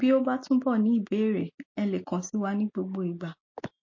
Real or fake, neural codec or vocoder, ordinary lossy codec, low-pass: real; none; MP3, 32 kbps; 7.2 kHz